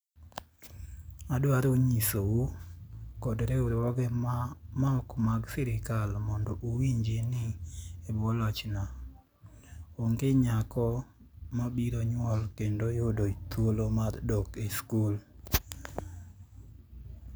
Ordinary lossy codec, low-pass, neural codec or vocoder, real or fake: none; none; none; real